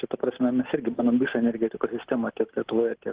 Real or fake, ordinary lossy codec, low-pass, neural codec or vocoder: real; Opus, 16 kbps; 3.6 kHz; none